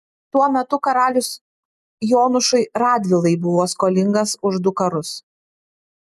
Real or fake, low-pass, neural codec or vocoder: real; 14.4 kHz; none